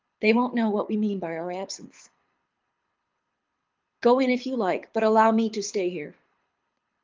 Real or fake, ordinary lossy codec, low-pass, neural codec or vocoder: fake; Opus, 32 kbps; 7.2 kHz; codec, 24 kHz, 6 kbps, HILCodec